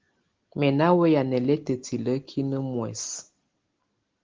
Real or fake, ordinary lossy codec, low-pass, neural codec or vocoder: real; Opus, 16 kbps; 7.2 kHz; none